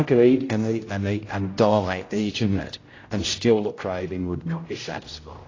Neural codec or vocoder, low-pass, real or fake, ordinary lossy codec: codec, 16 kHz, 0.5 kbps, X-Codec, HuBERT features, trained on general audio; 7.2 kHz; fake; AAC, 32 kbps